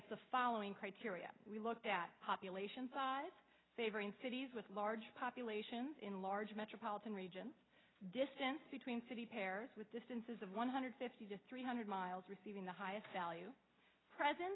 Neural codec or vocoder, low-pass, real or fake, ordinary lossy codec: none; 7.2 kHz; real; AAC, 16 kbps